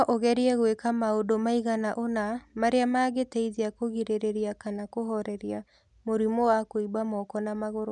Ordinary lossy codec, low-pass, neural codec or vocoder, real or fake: none; 10.8 kHz; none; real